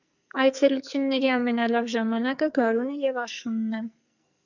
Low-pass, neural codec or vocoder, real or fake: 7.2 kHz; codec, 44.1 kHz, 2.6 kbps, SNAC; fake